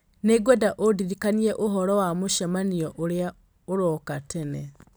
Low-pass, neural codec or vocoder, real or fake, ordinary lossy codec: none; none; real; none